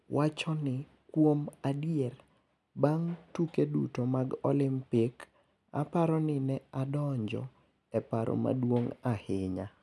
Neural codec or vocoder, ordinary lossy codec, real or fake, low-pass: none; none; real; none